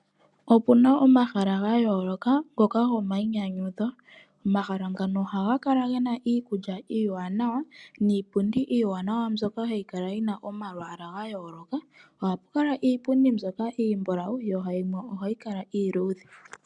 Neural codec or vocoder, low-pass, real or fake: none; 10.8 kHz; real